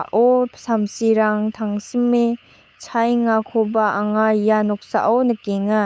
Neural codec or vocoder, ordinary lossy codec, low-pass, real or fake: codec, 16 kHz, 8 kbps, FunCodec, trained on LibriTTS, 25 frames a second; none; none; fake